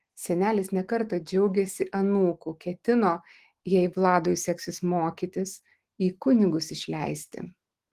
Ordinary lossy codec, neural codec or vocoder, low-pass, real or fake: Opus, 16 kbps; autoencoder, 48 kHz, 128 numbers a frame, DAC-VAE, trained on Japanese speech; 14.4 kHz; fake